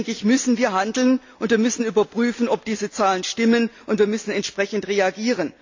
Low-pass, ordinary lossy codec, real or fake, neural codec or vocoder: 7.2 kHz; AAC, 48 kbps; real; none